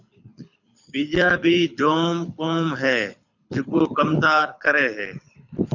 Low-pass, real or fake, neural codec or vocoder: 7.2 kHz; fake; codec, 24 kHz, 6 kbps, HILCodec